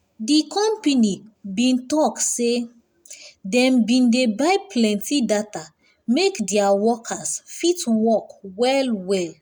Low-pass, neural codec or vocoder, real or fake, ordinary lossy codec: 19.8 kHz; none; real; none